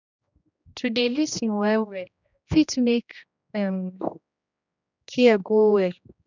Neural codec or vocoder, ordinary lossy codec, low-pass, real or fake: codec, 16 kHz, 1 kbps, X-Codec, HuBERT features, trained on general audio; none; 7.2 kHz; fake